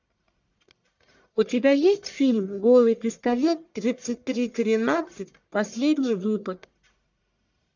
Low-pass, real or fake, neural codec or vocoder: 7.2 kHz; fake; codec, 44.1 kHz, 1.7 kbps, Pupu-Codec